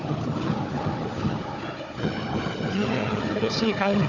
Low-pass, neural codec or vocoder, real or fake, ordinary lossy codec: 7.2 kHz; codec, 16 kHz, 4 kbps, FunCodec, trained on Chinese and English, 50 frames a second; fake; none